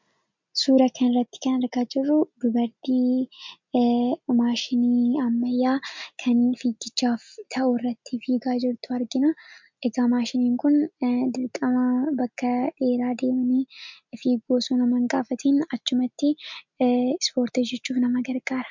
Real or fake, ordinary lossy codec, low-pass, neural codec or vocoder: real; MP3, 64 kbps; 7.2 kHz; none